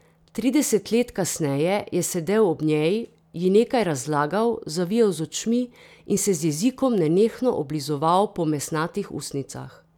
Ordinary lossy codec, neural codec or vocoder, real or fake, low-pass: none; none; real; 19.8 kHz